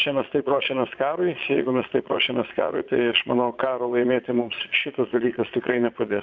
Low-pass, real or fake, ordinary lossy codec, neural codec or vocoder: 7.2 kHz; fake; MP3, 64 kbps; vocoder, 22.05 kHz, 80 mel bands, Vocos